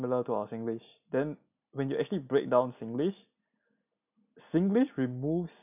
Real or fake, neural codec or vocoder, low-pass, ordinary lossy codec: real; none; 3.6 kHz; none